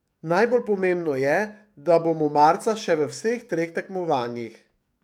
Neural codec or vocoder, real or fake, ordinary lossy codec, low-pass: codec, 44.1 kHz, 7.8 kbps, DAC; fake; none; 19.8 kHz